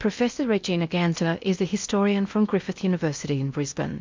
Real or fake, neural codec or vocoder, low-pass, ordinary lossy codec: fake; codec, 16 kHz in and 24 kHz out, 0.8 kbps, FocalCodec, streaming, 65536 codes; 7.2 kHz; MP3, 64 kbps